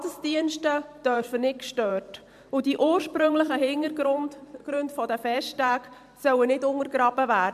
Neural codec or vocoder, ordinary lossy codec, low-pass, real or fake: vocoder, 44.1 kHz, 128 mel bands every 512 samples, BigVGAN v2; MP3, 96 kbps; 14.4 kHz; fake